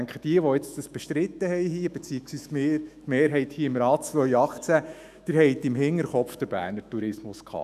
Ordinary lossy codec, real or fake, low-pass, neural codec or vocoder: none; fake; 14.4 kHz; autoencoder, 48 kHz, 128 numbers a frame, DAC-VAE, trained on Japanese speech